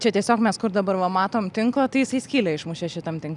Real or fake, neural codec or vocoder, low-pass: real; none; 10.8 kHz